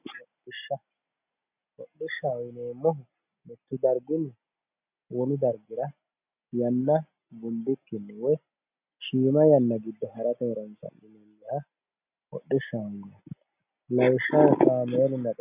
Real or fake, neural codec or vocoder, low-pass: real; none; 3.6 kHz